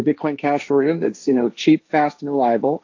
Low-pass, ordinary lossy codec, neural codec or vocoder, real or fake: 7.2 kHz; AAC, 48 kbps; codec, 16 kHz, 1.1 kbps, Voila-Tokenizer; fake